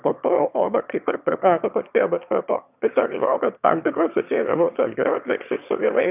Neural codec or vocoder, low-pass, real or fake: autoencoder, 22.05 kHz, a latent of 192 numbers a frame, VITS, trained on one speaker; 3.6 kHz; fake